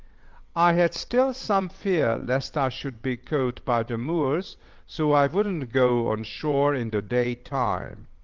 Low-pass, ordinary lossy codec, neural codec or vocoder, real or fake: 7.2 kHz; Opus, 32 kbps; vocoder, 22.05 kHz, 80 mel bands, WaveNeXt; fake